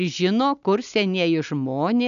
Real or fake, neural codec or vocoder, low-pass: real; none; 7.2 kHz